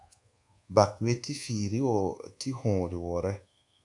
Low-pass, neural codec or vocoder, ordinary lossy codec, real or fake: 10.8 kHz; codec, 24 kHz, 1.2 kbps, DualCodec; AAC, 64 kbps; fake